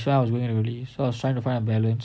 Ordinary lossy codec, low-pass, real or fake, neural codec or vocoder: none; none; real; none